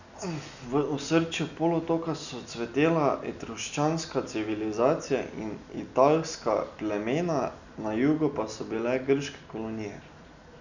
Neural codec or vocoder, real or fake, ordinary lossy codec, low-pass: none; real; none; 7.2 kHz